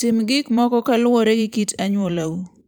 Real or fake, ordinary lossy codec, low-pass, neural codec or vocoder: fake; none; none; vocoder, 44.1 kHz, 128 mel bands every 512 samples, BigVGAN v2